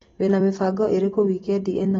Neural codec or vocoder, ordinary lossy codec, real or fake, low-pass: vocoder, 44.1 kHz, 128 mel bands every 256 samples, BigVGAN v2; AAC, 24 kbps; fake; 19.8 kHz